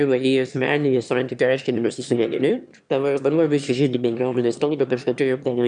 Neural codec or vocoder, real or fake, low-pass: autoencoder, 22.05 kHz, a latent of 192 numbers a frame, VITS, trained on one speaker; fake; 9.9 kHz